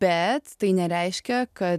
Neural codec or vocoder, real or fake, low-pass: none; real; 14.4 kHz